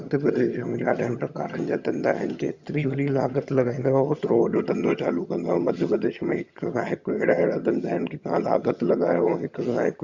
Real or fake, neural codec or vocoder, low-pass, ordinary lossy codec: fake; vocoder, 22.05 kHz, 80 mel bands, HiFi-GAN; 7.2 kHz; Opus, 64 kbps